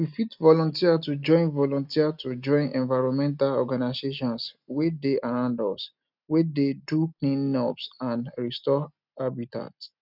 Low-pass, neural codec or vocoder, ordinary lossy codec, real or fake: 5.4 kHz; none; none; real